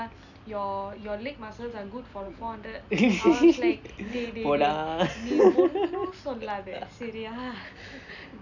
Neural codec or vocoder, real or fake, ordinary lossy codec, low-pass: none; real; none; 7.2 kHz